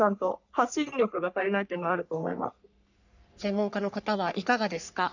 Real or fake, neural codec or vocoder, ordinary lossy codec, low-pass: fake; codec, 44.1 kHz, 3.4 kbps, Pupu-Codec; none; 7.2 kHz